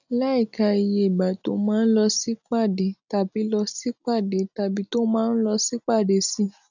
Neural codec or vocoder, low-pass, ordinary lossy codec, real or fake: none; 7.2 kHz; none; real